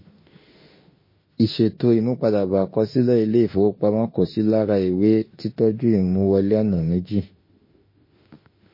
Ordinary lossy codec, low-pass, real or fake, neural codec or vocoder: MP3, 24 kbps; 5.4 kHz; fake; autoencoder, 48 kHz, 32 numbers a frame, DAC-VAE, trained on Japanese speech